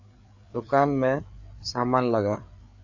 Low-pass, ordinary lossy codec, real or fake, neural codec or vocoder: 7.2 kHz; AAC, 48 kbps; fake; codec, 16 kHz, 4 kbps, FreqCodec, larger model